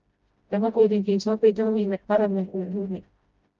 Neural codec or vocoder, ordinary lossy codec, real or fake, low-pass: codec, 16 kHz, 0.5 kbps, FreqCodec, smaller model; Opus, 24 kbps; fake; 7.2 kHz